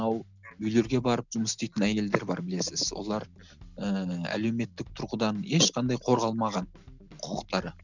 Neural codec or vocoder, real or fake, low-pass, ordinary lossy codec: none; real; 7.2 kHz; none